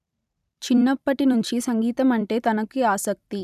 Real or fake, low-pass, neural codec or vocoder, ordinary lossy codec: fake; 14.4 kHz; vocoder, 44.1 kHz, 128 mel bands every 256 samples, BigVGAN v2; none